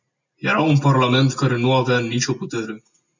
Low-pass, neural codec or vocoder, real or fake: 7.2 kHz; none; real